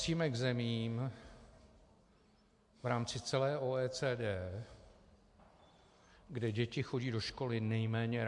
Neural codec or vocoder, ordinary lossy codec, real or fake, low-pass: none; MP3, 64 kbps; real; 10.8 kHz